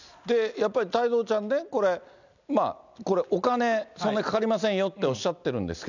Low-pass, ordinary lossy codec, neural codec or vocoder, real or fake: 7.2 kHz; none; none; real